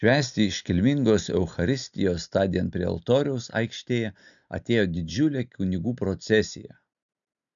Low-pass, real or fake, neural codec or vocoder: 7.2 kHz; real; none